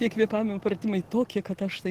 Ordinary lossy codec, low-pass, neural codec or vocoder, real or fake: Opus, 16 kbps; 14.4 kHz; none; real